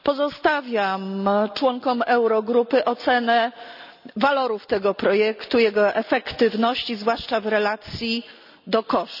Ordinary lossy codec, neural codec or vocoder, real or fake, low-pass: none; none; real; 5.4 kHz